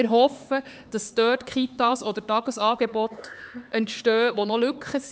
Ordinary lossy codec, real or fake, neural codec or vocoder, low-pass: none; fake; codec, 16 kHz, 4 kbps, X-Codec, HuBERT features, trained on LibriSpeech; none